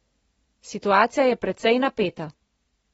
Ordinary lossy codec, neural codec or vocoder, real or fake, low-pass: AAC, 24 kbps; none; real; 19.8 kHz